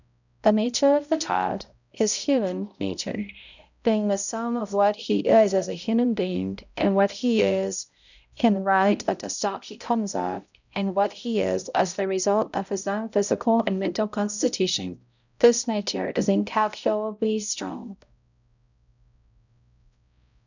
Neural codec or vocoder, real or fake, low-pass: codec, 16 kHz, 0.5 kbps, X-Codec, HuBERT features, trained on balanced general audio; fake; 7.2 kHz